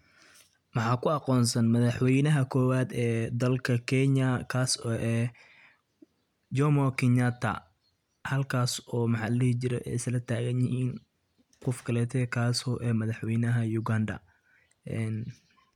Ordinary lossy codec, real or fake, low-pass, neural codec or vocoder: none; real; 19.8 kHz; none